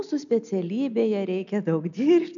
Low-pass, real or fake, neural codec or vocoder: 7.2 kHz; real; none